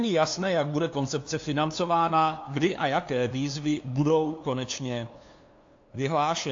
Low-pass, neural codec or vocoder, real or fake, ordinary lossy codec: 7.2 kHz; codec, 16 kHz, 2 kbps, FunCodec, trained on LibriTTS, 25 frames a second; fake; AAC, 48 kbps